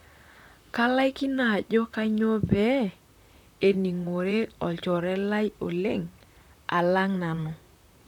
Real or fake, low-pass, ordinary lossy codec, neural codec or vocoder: fake; 19.8 kHz; none; vocoder, 44.1 kHz, 128 mel bands, Pupu-Vocoder